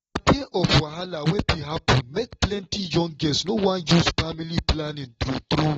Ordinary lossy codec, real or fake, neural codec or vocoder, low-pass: AAC, 24 kbps; real; none; 19.8 kHz